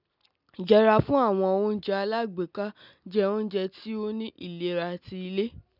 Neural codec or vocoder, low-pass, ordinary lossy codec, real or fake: none; 5.4 kHz; none; real